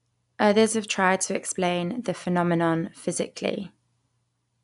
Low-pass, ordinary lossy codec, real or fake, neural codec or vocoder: 10.8 kHz; none; real; none